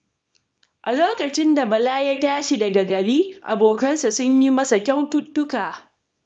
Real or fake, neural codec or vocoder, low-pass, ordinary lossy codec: fake; codec, 24 kHz, 0.9 kbps, WavTokenizer, small release; 9.9 kHz; none